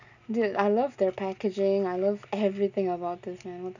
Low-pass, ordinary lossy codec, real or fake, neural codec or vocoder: 7.2 kHz; none; real; none